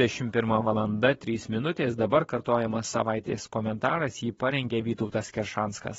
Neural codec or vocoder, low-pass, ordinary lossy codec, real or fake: vocoder, 22.05 kHz, 80 mel bands, Vocos; 9.9 kHz; AAC, 24 kbps; fake